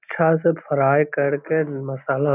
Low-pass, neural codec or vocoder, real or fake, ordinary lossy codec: 3.6 kHz; none; real; none